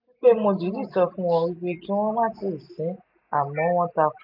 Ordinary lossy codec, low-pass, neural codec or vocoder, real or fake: MP3, 48 kbps; 5.4 kHz; none; real